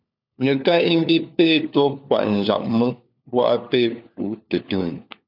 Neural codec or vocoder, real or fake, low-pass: codec, 16 kHz, 4 kbps, FunCodec, trained on LibriTTS, 50 frames a second; fake; 5.4 kHz